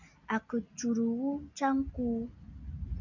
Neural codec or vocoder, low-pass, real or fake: none; 7.2 kHz; real